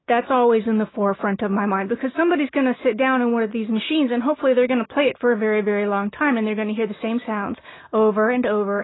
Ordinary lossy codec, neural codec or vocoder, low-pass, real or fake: AAC, 16 kbps; none; 7.2 kHz; real